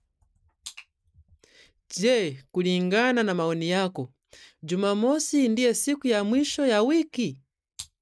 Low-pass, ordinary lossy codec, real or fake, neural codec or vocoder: none; none; real; none